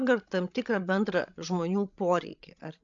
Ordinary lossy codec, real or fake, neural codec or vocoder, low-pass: AAC, 64 kbps; fake; codec, 16 kHz, 16 kbps, FreqCodec, larger model; 7.2 kHz